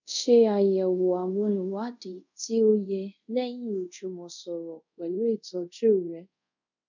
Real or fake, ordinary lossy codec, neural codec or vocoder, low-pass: fake; none; codec, 24 kHz, 0.5 kbps, DualCodec; 7.2 kHz